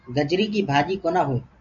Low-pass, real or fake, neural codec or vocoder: 7.2 kHz; real; none